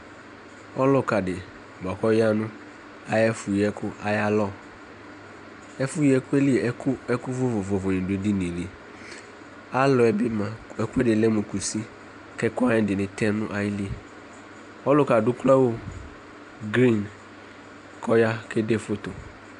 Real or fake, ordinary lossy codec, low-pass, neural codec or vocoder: real; AAC, 96 kbps; 10.8 kHz; none